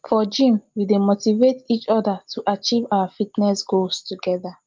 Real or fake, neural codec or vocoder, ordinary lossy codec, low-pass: real; none; Opus, 24 kbps; 7.2 kHz